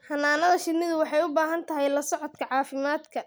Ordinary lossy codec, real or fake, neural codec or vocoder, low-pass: none; real; none; none